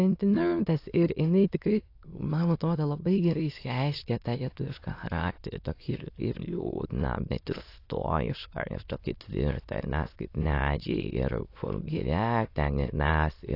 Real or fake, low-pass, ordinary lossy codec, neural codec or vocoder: fake; 5.4 kHz; AAC, 32 kbps; autoencoder, 22.05 kHz, a latent of 192 numbers a frame, VITS, trained on many speakers